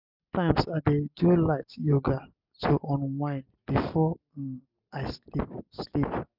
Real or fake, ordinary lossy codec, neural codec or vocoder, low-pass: real; none; none; 5.4 kHz